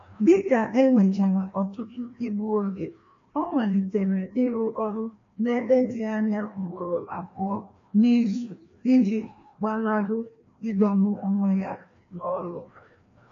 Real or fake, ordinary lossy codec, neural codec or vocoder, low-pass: fake; AAC, 48 kbps; codec, 16 kHz, 1 kbps, FreqCodec, larger model; 7.2 kHz